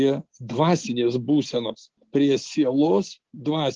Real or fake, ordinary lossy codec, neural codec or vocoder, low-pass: real; Opus, 16 kbps; none; 7.2 kHz